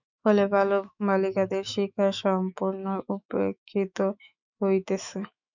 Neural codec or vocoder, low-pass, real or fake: autoencoder, 48 kHz, 128 numbers a frame, DAC-VAE, trained on Japanese speech; 7.2 kHz; fake